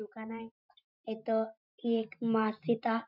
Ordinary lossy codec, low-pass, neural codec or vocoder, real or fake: none; 5.4 kHz; vocoder, 44.1 kHz, 128 mel bands every 512 samples, BigVGAN v2; fake